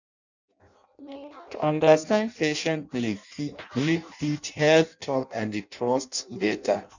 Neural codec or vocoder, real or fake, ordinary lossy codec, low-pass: codec, 16 kHz in and 24 kHz out, 0.6 kbps, FireRedTTS-2 codec; fake; none; 7.2 kHz